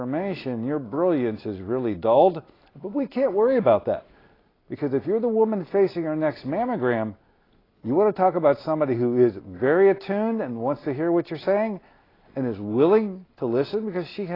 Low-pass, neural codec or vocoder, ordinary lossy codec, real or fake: 5.4 kHz; none; AAC, 24 kbps; real